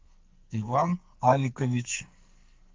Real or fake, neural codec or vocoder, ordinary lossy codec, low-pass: fake; codec, 44.1 kHz, 2.6 kbps, SNAC; Opus, 32 kbps; 7.2 kHz